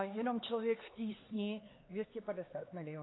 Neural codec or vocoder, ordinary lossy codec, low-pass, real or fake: codec, 16 kHz, 4 kbps, X-Codec, HuBERT features, trained on LibriSpeech; AAC, 16 kbps; 7.2 kHz; fake